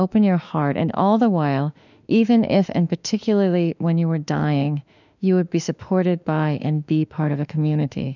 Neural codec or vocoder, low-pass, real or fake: autoencoder, 48 kHz, 32 numbers a frame, DAC-VAE, trained on Japanese speech; 7.2 kHz; fake